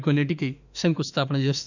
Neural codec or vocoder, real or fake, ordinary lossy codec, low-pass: autoencoder, 48 kHz, 32 numbers a frame, DAC-VAE, trained on Japanese speech; fake; none; 7.2 kHz